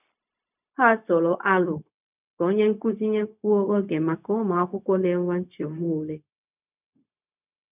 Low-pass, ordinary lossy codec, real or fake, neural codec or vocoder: 3.6 kHz; none; fake; codec, 16 kHz, 0.4 kbps, LongCat-Audio-Codec